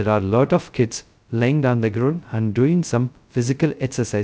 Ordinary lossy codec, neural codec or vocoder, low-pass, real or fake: none; codec, 16 kHz, 0.2 kbps, FocalCodec; none; fake